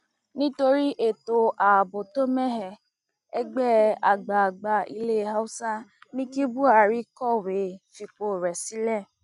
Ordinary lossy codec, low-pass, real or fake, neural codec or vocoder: none; 10.8 kHz; real; none